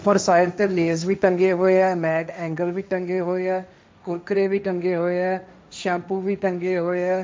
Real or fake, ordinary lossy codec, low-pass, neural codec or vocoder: fake; none; none; codec, 16 kHz, 1.1 kbps, Voila-Tokenizer